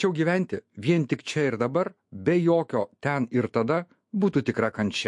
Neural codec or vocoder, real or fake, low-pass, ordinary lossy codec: autoencoder, 48 kHz, 128 numbers a frame, DAC-VAE, trained on Japanese speech; fake; 10.8 kHz; MP3, 48 kbps